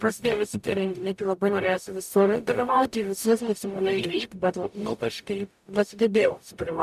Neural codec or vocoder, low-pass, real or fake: codec, 44.1 kHz, 0.9 kbps, DAC; 14.4 kHz; fake